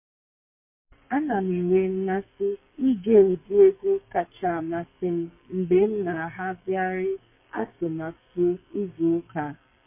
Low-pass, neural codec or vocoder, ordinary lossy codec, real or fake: 3.6 kHz; codec, 44.1 kHz, 2.6 kbps, SNAC; MP3, 24 kbps; fake